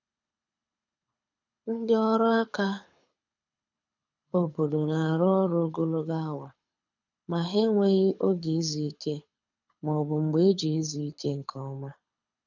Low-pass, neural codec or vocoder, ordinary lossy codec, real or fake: 7.2 kHz; codec, 24 kHz, 6 kbps, HILCodec; none; fake